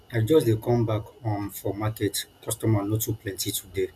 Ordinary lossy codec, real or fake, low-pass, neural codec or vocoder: none; real; 14.4 kHz; none